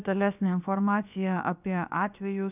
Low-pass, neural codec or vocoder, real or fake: 3.6 kHz; codec, 16 kHz, about 1 kbps, DyCAST, with the encoder's durations; fake